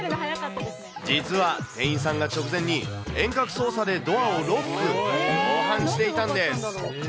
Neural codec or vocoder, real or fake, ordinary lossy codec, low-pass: none; real; none; none